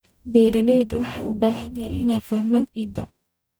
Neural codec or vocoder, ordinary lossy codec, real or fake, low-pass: codec, 44.1 kHz, 0.9 kbps, DAC; none; fake; none